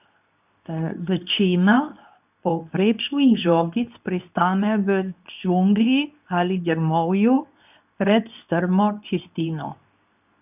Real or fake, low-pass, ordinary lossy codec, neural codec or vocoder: fake; 3.6 kHz; none; codec, 24 kHz, 0.9 kbps, WavTokenizer, medium speech release version 2